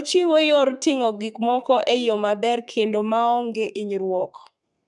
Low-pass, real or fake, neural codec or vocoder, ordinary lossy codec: 10.8 kHz; fake; codec, 32 kHz, 1.9 kbps, SNAC; none